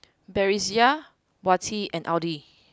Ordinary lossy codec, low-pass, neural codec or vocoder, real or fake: none; none; none; real